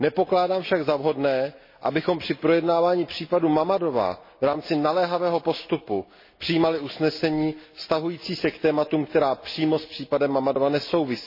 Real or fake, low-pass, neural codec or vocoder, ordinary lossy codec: real; 5.4 kHz; none; MP3, 24 kbps